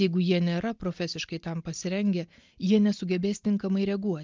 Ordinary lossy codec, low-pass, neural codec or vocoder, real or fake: Opus, 24 kbps; 7.2 kHz; none; real